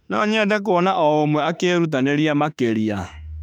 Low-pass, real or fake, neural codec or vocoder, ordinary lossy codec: 19.8 kHz; fake; autoencoder, 48 kHz, 32 numbers a frame, DAC-VAE, trained on Japanese speech; none